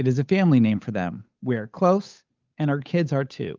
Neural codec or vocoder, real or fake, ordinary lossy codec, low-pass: codec, 16 kHz, 8 kbps, FunCodec, trained on Chinese and English, 25 frames a second; fake; Opus, 32 kbps; 7.2 kHz